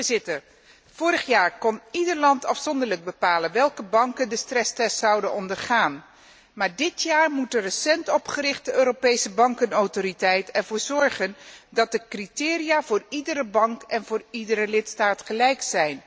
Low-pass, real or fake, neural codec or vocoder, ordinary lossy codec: none; real; none; none